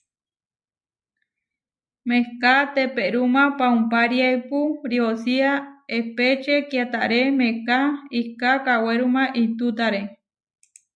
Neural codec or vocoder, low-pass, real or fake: none; 9.9 kHz; real